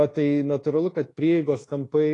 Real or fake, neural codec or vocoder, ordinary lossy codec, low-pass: fake; codec, 24 kHz, 1.2 kbps, DualCodec; AAC, 32 kbps; 10.8 kHz